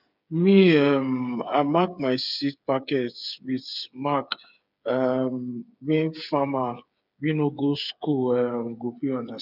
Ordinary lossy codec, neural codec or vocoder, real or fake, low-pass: none; codec, 16 kHz, 8 kbps, FreqCodec, smaller model; fake; 5.4 kHz